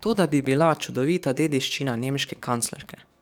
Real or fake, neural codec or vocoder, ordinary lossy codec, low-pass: fake; codec, 44.1 kHz, 7.8 kbps, DAC; none; 19.8 kHz